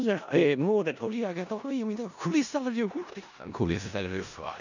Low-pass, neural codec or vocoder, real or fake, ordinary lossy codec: 7.2 kHz; codec, 16 kHz in and 24 kHz out, 0.4 kbps, LongCat-Audio-Codec, four codebook decoder; fake; none